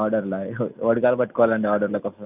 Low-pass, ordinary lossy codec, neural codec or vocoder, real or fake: 3.6 kHz; none; none; real